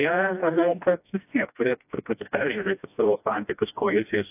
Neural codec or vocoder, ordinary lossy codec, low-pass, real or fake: codec, 16 kHz, 1 kbps, FreqCodec, smaller model; AAC, 32 kbps; 3.6 kHz; fake